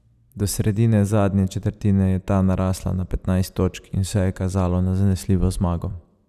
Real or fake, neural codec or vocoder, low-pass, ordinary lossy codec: fake; vocoder, 44.1 kHz, 128 mel bands every 256 samples, BigVGAN v2; 14.4 kHz; none